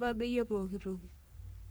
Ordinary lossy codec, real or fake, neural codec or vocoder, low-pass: none; fake; codec, 44.1 kHz, 3.4 kbps, Pupu-Codec; none